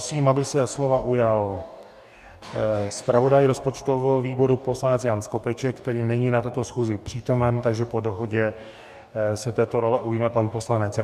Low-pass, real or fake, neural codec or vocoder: 14.4 kHz; fake; codec, 44.1 kHz, 2.6 kbps, DAC